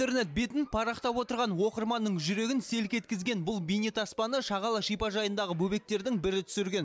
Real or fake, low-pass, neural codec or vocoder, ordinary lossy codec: real; none; none; none